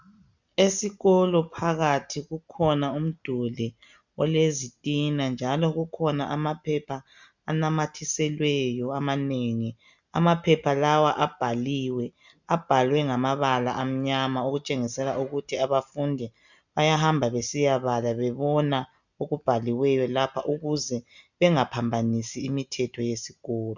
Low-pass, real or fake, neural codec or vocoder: 7.2 kHz; real; none